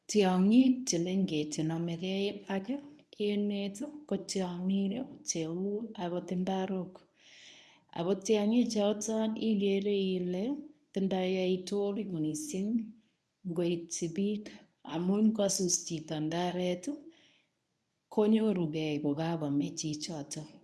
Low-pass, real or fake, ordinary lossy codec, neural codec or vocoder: none; fake; none; codec, 24 kHz, 0.9 kbps, WavTokenizer, medium speech release version 1